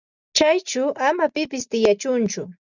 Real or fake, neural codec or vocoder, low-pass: real; none; 7.2 kHz